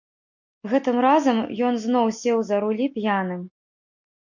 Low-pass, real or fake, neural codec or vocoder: 7.2 kHz; real; none